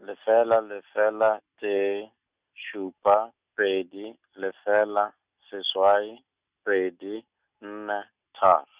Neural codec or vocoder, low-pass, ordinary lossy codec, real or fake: none; 3.6 kHz; Opus, 64 kbps; real